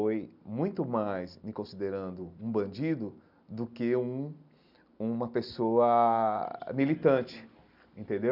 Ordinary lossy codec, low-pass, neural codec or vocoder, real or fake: none; 5.4 kHz; none; real